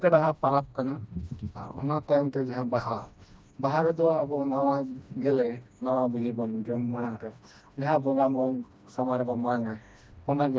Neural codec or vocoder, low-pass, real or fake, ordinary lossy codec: codec, 16 kHz, 1 kbps, FreqCodec, smaller model; none; fake; none